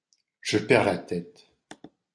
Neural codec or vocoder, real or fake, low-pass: none; real; 9.9 kHz